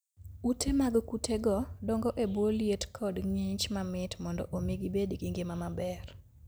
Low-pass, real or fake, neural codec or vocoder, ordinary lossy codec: none; real; none; none